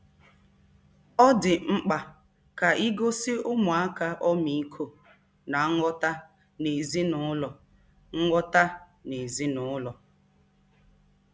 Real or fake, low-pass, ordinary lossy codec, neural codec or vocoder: real; none; none; none